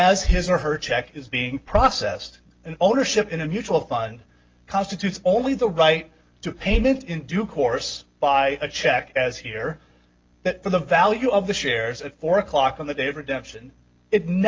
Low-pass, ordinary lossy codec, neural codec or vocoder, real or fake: 7.2 kHz; Opus, 24 kbps; none; real